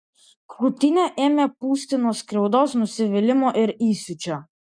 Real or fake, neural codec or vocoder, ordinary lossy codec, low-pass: real; none; MP3, 96 kbps; 9.9 kHz